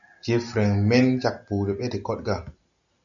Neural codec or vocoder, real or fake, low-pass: none; real; 7.2 kHz